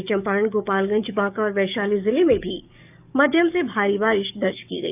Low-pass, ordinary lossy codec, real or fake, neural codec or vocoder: 3.6 kHz; none; fake; codec, 44.1 kHz, 7.8 kbps, DAC